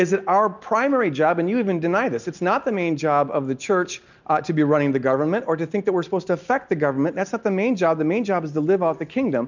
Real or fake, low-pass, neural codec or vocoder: real; 7.2 kHz; none